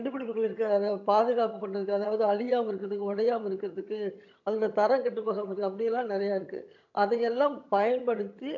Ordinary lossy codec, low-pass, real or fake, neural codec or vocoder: AAC, 48 kbps; 7.2 kHz; fake; vocoder, 22.05 kHz, 80 mel bands, HiFi-GAN